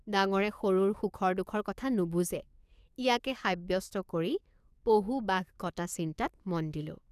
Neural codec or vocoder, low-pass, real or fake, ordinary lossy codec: codec, 44.1 kHz, 7.8 kbps, DAC; 14.4 kHz; fake; none